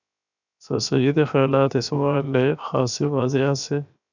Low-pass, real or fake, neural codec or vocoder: 7.2 kHz; fake; codec, 16 kHz, 0.7 kbps, FocalCodec